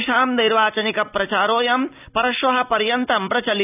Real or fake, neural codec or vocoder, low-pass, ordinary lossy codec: real; none; 3.6 kHz; none